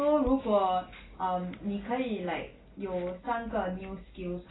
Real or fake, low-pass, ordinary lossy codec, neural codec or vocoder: real; 7.2 kHz; AAC, 16 kbps; none